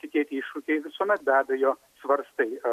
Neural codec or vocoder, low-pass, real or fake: none; 14.4 kHz; real